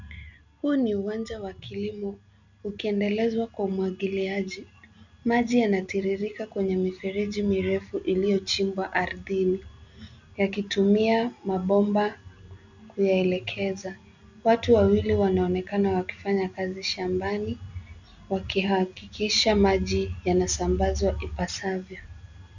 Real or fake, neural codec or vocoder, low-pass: real; none; 7.2 kHz